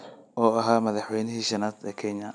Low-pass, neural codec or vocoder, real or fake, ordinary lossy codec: 9.9 kHz; none; real; none